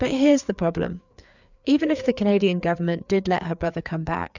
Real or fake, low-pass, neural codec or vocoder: fake; 7.2 kHz; codec, 16 kHz, 4 kbps, FreqCodec, larger model